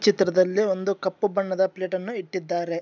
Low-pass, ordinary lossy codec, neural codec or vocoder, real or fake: none; none; none; real